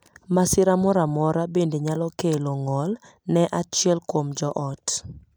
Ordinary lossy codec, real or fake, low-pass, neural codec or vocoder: none; real; none; none